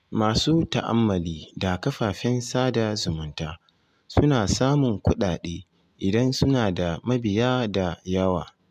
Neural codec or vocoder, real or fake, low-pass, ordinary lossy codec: none; real; 14.4 kHz; MP3, 96 kbps